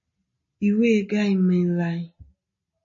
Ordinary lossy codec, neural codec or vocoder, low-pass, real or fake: MP3, 32 kbps; none; 7.2 kHz; real